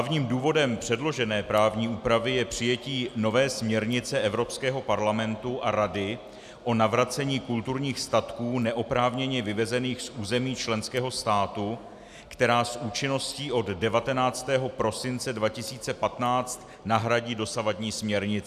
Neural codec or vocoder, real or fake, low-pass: none; real; 14.4 kHz